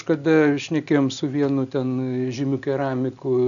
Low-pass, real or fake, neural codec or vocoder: 7.2 kHz; real; none